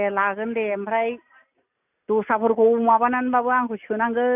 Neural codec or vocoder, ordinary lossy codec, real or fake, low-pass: none; none; real; 3.6 kHz